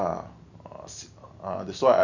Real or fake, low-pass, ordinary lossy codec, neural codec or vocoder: real; 7.2 kHz; none; none